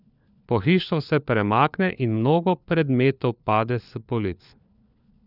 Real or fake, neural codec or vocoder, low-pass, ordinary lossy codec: fake; codec, 16 kHz, 4 kbps, FunCodec, trained on LibriTTS, 50 frames a second; 5.4 kHz; none